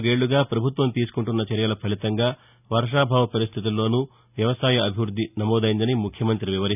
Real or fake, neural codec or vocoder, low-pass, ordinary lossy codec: real; none; 3.6 kHz; none